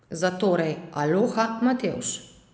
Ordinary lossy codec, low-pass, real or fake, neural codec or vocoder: none; none; real; none